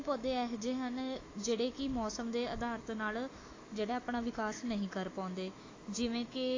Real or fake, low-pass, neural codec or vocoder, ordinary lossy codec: fake; 7.2 kHz; autoencoder, 48 kHz, 128 numbers a frame, DAC-VAE, trained on Japanese speech; AAC, 32 kbps